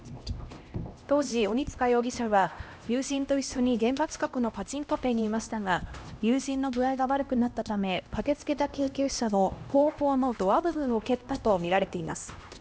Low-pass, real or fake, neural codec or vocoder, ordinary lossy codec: none; fake; codec, 16 kHz, 1 kbps, X-Codec, HuBERT features, trained on LibriSpeech; none